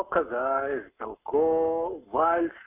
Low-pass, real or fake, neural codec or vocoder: 3.6 kHz; fake; codec, 44.1 kHz, 7.8 kbps, Pupu-Codec